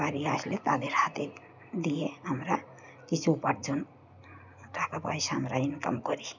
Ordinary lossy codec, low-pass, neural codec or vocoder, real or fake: none; 7.2 kHz; none; real